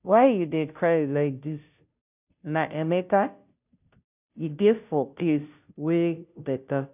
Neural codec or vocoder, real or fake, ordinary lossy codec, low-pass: codec, 16 kHz, 0.5 kbps, FunCodec, trained on Chinese and English, 25 frames a second; fake; none; 3.6 kHz